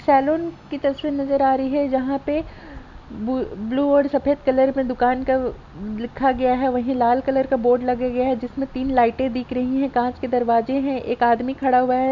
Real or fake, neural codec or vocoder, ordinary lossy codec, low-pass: real; none; none; 7.2 kHz